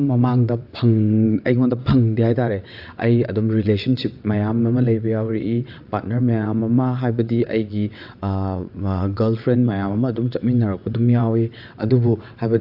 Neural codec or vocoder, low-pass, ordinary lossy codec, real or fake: vocoder, 22.05 kHz, 80 mel bands, WaveNeXt; 5.4 kHz; AAC, 48 kbps; fake